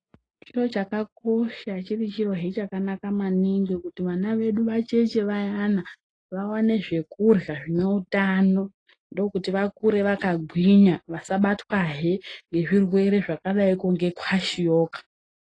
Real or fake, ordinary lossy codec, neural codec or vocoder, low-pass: real; AAC, 32 kbps; none; 9.9 kHz